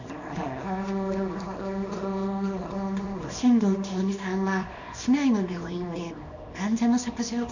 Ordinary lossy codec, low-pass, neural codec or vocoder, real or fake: none; 7.2 kHz; codec, 24 kHz, 0.9 kbps, WavTokenizer, small release; fake